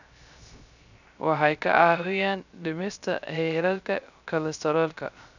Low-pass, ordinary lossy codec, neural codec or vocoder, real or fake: 7.2 kHz; none; codec, 16 kHz, 0.3 kbps, FocalCodec; fake